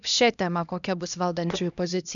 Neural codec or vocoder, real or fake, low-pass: codec, 16 kHz, 1 kbps, X-Codec, HuBERT features, trained on LibriSpeech; fake; 7.2 kHz